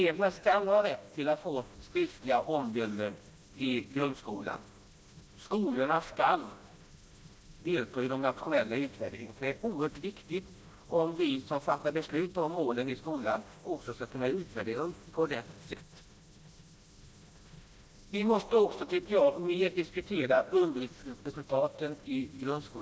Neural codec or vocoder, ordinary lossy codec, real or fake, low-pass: codec, 16 kHz, 1 kbps, FreqCodec, smaller model; none; fake; none